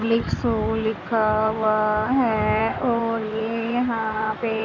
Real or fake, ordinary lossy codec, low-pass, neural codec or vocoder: fake; none; 7.2 kHz; codec, 16 kHz in and 24 kHz out, 2.2 kbps, FireRedTTS-2 codec